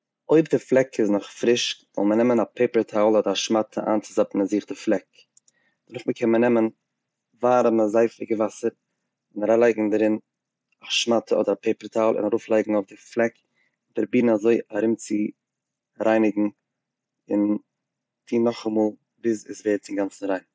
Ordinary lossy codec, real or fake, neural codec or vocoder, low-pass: none; real; none; none